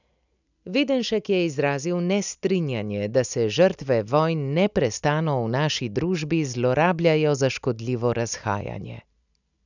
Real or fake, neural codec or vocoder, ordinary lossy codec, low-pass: real; none; none; 7.2 kHz